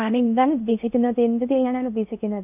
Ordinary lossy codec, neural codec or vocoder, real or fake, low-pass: none; codec, 16 kHz in and 24 kHz out, 0.6 kbps, FocalCodec, streaming, 2048 codes; fake; 3.6 kHz